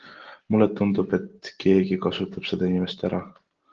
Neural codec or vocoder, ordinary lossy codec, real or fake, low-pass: none; Opus, 16 kbps; real; 7.2 kHz